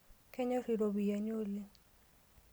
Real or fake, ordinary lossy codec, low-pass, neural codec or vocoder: real; none; none; none